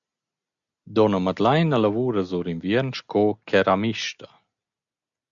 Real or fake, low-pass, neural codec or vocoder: real; 7.2 kHz; none